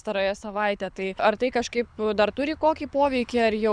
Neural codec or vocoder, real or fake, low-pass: codec, 24 kHz, 6 kbps, HILCodec; fake; 9.9 kHz